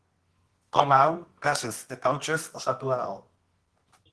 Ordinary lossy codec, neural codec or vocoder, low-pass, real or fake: Opus, 16 kbps; codec, 24 kHz, 0.9 kbps, WavTokenizer, medium music audio release; 10.8 kHz; fake